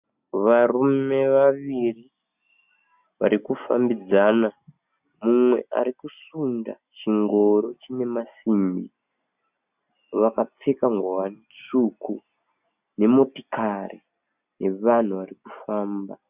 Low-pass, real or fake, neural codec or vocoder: 3.6 kHz; real; none